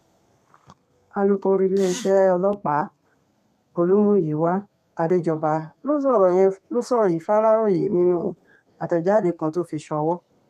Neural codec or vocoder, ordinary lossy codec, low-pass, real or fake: codec, 32 kHz, 1.9 kbps, SNAC; none; 14.4 kHz; fake